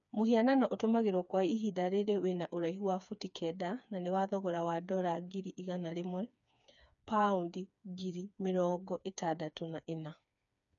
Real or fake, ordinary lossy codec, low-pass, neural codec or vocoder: fake; none; 7.2 kHz; codec, 16 kHz, 4 kbps, FreqCodec, smaller model